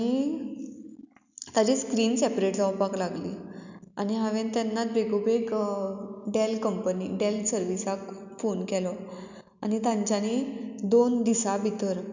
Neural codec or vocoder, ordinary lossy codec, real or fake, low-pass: none; none; real; 7.2 kHz